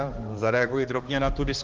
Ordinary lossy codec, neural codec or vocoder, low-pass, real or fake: Opus, 16 kbps; codec, 16 kHz, 2 kbps, X-Codec, HuBERT features, trained on balanced general audio; 7.2 kHz; fake